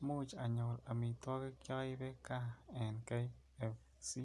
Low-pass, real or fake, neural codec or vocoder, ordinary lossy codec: none; real; none; none